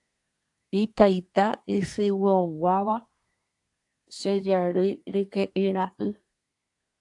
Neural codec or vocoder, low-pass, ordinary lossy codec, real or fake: codec, 24 kHz, 1 kbps, SNAC; 10.8 kHz; AAC, 64 kbps; fake